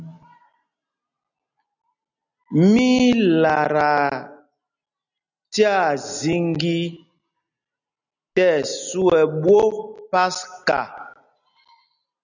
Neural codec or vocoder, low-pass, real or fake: none; 7.2 kHz; real